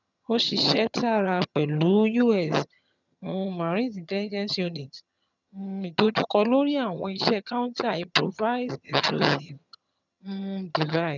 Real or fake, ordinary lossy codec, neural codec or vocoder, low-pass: fake; none; vocoder, 22.05 kHz, 80 mel bands, HiFi-GAN; 7.2 kHz